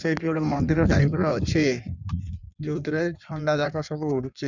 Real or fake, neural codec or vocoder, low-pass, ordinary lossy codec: fake; codec, 16 kHz in and 24 kHz out, 1.1 kbps, FireRedTTS-2 codec; 7.2 kHz; none